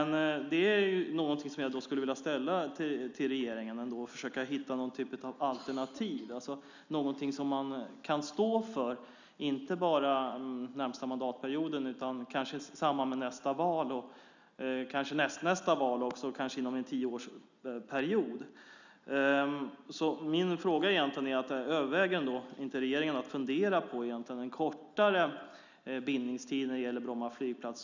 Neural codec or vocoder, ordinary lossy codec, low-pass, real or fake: none; none; 7.2 kHz; real